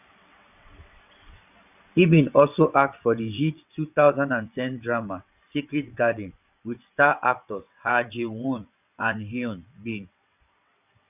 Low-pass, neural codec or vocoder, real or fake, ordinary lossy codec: 3.6 kHz; vocoder, 22.05 kHz, 80 mel bands, WaveNeXt; fake; none